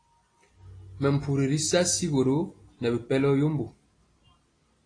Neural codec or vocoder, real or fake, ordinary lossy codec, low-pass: none; real; AAC, 32 kbps; 9.9 kHz